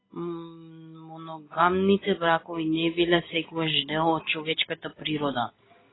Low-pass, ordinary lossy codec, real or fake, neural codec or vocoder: 7.2 kHz; AAC, 16 kbps; real; none